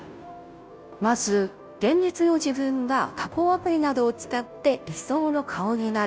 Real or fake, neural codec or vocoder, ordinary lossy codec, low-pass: fake; codec, 16 kHz, 0.5 kbps, FunCodec, trained on Chinese and English, 25 frames a second; none; none